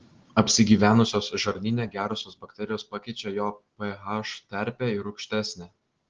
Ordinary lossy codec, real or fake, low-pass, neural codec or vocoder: Opus, 16 kbps; real; 7.2 kHz; none